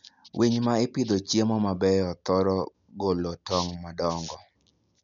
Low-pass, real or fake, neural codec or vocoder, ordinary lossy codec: 7.2 kHz; real; none; none